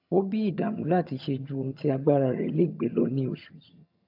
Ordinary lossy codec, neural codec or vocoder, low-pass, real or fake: AAC, 48 kbps; vocoder, 22.05 kHz, 80 mel bands, HiFi-GAN; 5.4 kHz; fake